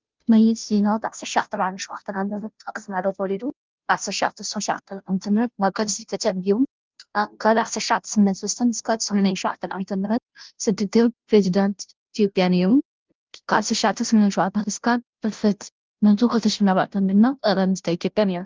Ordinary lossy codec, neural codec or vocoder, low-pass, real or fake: Opus, 24 kbps; codec, 16 kHz, 0.5 kbps, FunCodec, trained on Chinese and English, 25 frames a second; 7.2 kHz; fake